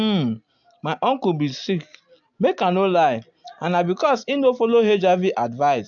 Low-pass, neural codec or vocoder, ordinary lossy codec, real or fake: 7.2 kHz; none; AAC, 64 kbps; real